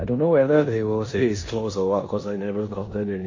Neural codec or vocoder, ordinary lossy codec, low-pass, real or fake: codec, 16 kHz in and 24 kHz out, 0.9 kbps, LongCat-Audio-Codec, four codebook decoder; MP3, 32 kbps; 7.2 kHz; fake